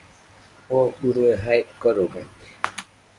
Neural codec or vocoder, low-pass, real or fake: codec, 24 kHz, 0.9 kbps, WavTokenizer, medium speech release version 1; 10.8 kHz; fake